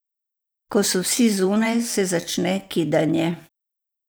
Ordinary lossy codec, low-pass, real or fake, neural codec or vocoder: none; none; fake; vocoder, 44.1 kHz, 128 mel bands every 512 samples, BigVGAN v2